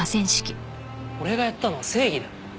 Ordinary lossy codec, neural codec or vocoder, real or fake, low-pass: none; none; real; none